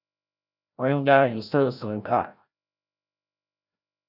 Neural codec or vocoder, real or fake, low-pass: codec, 16 kHz, 0.5 kbps, FreqCodec, larger model; fake; 5.4 kHz